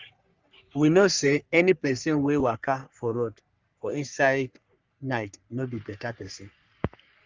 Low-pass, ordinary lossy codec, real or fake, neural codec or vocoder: 7.2 kHz; Opus, 32 kbps; fake; codec, 44.1 kHz, 3.4 kbps, Pupu-Codec